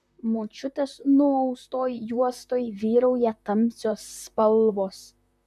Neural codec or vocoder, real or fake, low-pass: vocoder, 44.1 kHz, 128 mel bands, Pupu-Vocoder; fake; 14.4 kHz